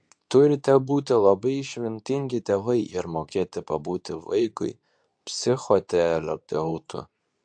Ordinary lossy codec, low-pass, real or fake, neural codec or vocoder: AAC, 64 kbps; 9.9 kHz; fake; codec, 24 kHz, 0.9 kbps, WavTokenizer, medium speech release version 2